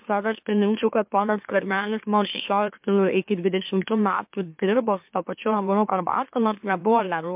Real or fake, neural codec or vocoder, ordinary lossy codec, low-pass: fake; autoencoder, 44.1 kHz, a latent of 192 numbers a frame, MeloTTS; MP3, 32 kbps; 3.6 kHz